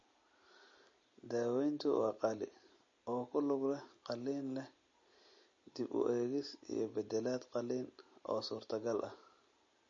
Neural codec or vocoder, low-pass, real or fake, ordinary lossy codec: none; 7.2 kHz; real; MP3, 32 kbps